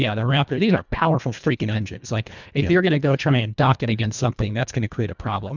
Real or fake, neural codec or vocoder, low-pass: fake; codec, 24 kHz, 1.5 kbps, HILCodec; 7.2 kHz